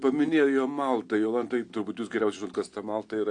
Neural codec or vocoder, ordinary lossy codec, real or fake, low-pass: none; AAC, 48 kbps; real; 9.9 kHz